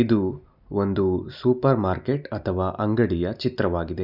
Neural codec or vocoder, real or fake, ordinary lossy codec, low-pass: none; real; none; 5.4 kHz